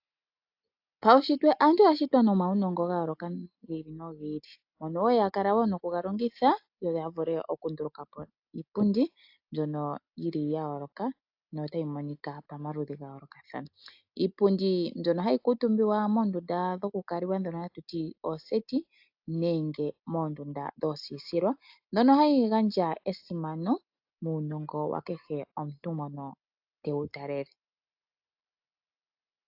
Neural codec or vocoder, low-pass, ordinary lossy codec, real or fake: none; 5.4 kHz; AAC, 48 kbps; real